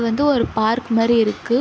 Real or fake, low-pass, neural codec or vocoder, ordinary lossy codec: real; none; none; none